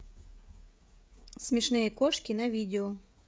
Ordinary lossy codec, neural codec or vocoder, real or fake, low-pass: none; codec, 16 kHz, 16 kbps, FreqCodec, smaller model; fake; none